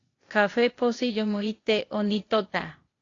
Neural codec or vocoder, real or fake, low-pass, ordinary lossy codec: codec, 16 kHz, 0.8 kbps, ZipCodec; fake; 7.2 kHz; AAC, 32 kbps